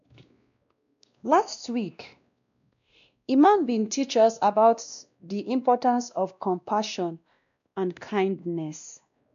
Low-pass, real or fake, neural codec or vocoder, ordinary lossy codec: 7.2 kHz; fake; codec, 16 kHz, 1 kbps, X-Codec, WavLM features, trained on Multilingual LibriSpeech; none